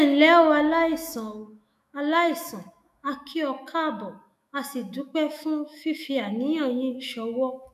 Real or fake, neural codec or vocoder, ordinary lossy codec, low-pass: fake; autoencoder, 48 kHz, 128 numbers a frame, DAC-VAE, trained on Japanese speech; none; 14.4 kHz